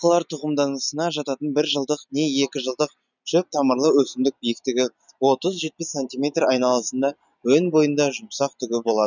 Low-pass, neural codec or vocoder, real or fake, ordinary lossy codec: 7.2 kHz; none; real; none